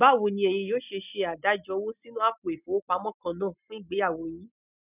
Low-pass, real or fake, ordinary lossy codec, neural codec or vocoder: 3.6 kHz; real; none; none